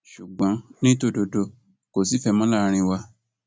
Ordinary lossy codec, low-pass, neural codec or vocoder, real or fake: none; none; none; real